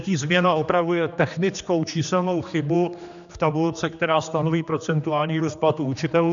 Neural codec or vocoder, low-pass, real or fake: codec, 16 kHz, 2 kbps, X-Codec, HuBERT features, trained on general audio; 7.2 kHz; fake